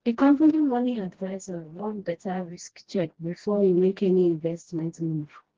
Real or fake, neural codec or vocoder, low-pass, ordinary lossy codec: fake; codec, 16 kHz, 1 kbps, FreqCodec, smaller model; 7.2 kHz; Opus, 16 kbps